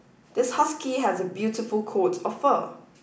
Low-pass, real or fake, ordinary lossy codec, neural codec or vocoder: none; real; none; none